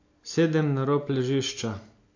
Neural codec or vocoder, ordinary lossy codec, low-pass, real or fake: none; AAC, 48 kbps; 7.2 kHz; real